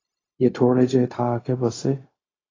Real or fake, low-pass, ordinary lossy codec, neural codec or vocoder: fake; 7.2 kHz; AAC, 32 kbps; codec, 16 kHz, 0.4 kbps, LongCat-Audio-Codec